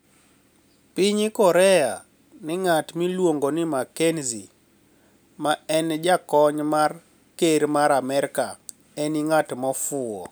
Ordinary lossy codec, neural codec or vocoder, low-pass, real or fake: none; none; none; real